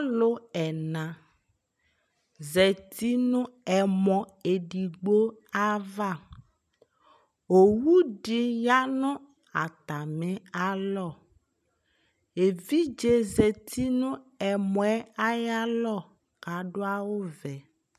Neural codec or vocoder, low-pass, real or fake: none; 14.4 kHz; real